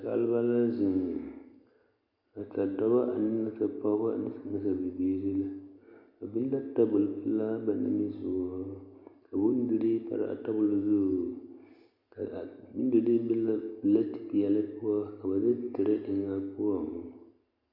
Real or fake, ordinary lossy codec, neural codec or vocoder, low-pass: real; Opus, 64 kbps; none; 5.4 kHz